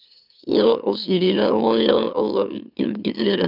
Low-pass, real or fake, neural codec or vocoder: 5.4 kHz; fake; autoencoder, 44.1 kHz, a latent of 192 numbers a frame, MeloTTS